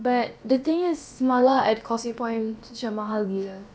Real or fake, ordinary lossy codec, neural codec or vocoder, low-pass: fake; none; codec, 16 kHz, about 1 kbps, DyCAST, with the encoder's durations; none